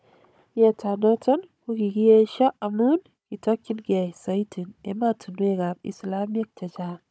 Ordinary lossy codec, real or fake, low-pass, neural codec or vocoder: none; fake; none; codec, 16 kHz, 16 kbps, FunCodec, trained on Chinese and English, 50 frames a second